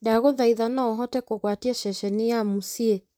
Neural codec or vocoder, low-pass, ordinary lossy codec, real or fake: vocoder, 44.1 kHz, 128 mel bands, Pupu-Vocoder; none; none; fake